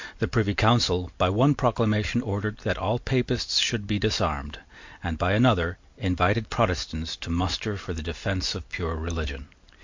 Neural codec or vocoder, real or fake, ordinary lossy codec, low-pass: none; real; MP3, 48 kbps; 7.2 kHz